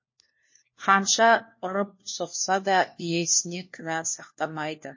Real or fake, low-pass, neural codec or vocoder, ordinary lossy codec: fake; 7.2 kHz; codec, 16 kHz, 1 kbps, FunCodec, trained on LibriTTS, 50 frames a second; MP3, 32 kbps